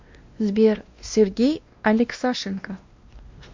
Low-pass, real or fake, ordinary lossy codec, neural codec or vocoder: 7.2 kHz; fake; MP3, 48 kbps; codec, 16 kHz, 1 kbps, X-Codec, WavLM features, trained on Multilingual LibriSpeech